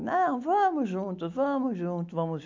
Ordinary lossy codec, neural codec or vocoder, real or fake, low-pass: MP3, 48 kbps; none; real; 7.2 kHz